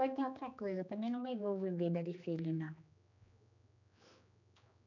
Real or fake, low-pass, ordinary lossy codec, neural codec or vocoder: fake; 7.2 kHz; none; codec, 16 kHz, 2 kbps, X-Codec, HuBERT features, trained on general audio